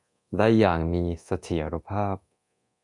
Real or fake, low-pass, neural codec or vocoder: fake; 10.8 kHz; codec, 24 kHz, 0.9 kbps, DualCodec